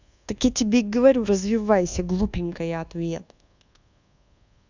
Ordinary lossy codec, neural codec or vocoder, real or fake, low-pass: none; codec, 24 kHz, 1.2 kbps, DualCodec; fake; 7.2 kHz